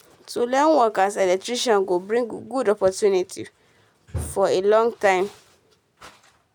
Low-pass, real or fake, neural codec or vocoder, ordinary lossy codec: 19.8 kHz; real; none; none